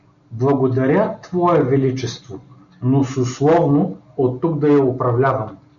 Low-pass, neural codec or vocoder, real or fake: 7.2 kHz; none; real